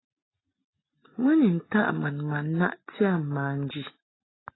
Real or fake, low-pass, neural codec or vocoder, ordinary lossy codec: real; 7.2 kHz; none; AAC, 16 kbps